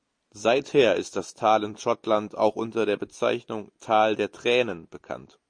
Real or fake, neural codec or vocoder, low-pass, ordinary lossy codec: real; none; 9.9 kHz; MP3, 48 kbps